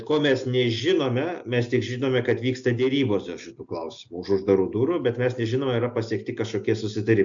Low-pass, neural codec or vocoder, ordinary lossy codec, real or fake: 7.2 kHz; none; MP3, 64 kbps; real